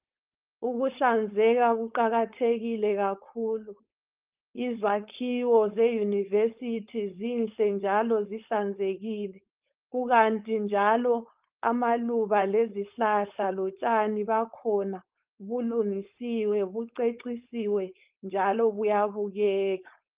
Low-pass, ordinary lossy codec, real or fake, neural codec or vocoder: 3.6 kHz; Opus, 24 kbps; fake; codec, 16 kHz, 4.8 kbps, FACodec